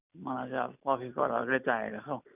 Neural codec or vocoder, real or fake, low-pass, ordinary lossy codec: none; real; 3.6 kHz; none